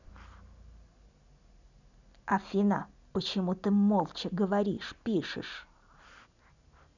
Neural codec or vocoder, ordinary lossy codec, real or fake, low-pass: none; none; real; 7.2 kHz